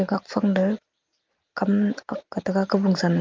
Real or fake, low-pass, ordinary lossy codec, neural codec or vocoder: real; 7.2 kHz; Opus, 24 kbps; none